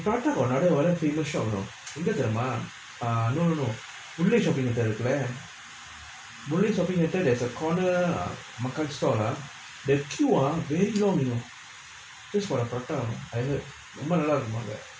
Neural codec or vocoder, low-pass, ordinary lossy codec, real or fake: none; none; none; real